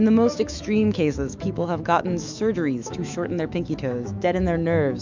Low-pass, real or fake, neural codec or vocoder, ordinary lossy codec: 7.2 kHz; fake; autoencoder, 48 kHz, 128 numbers a frame, DAC-VAE, trained on Japanese speech; MP3, 64 kbps